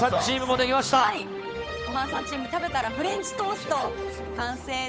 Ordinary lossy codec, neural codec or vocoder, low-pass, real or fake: none; codec, 16 kHz, 8 kbps, FunCodec, trained on Chinese and English, 25 frames a second; none; fake